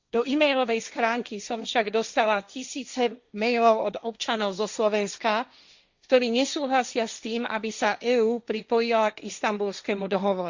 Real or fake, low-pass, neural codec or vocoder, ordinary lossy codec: fake; 7.2 kHz; codec, 16 kHz, 1.1 kbps, Voila-Tokenizer; Opus, 64 kbps